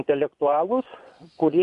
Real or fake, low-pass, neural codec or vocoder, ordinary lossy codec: fake; 10.8 kHz; vocoder, 24 kHz, 100 mel bands, Vocos; AAC, 96 kbps